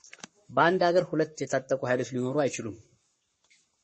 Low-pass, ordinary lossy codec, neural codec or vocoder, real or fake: 10.8 kHz; MP3, 32 kbps; codec, 44.1 kHz, 7.8 kbps, Pupu-Codec; fake